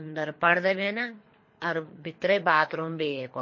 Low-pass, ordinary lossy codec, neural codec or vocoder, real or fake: 7.2 kHz; MP3, 32 kbps; codec, 24 kHz, 3 kbps, HILCodec; fake